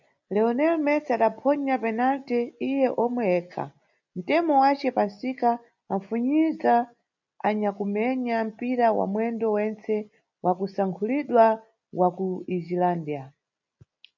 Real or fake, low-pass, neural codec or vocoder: real; 7.2 kHz; none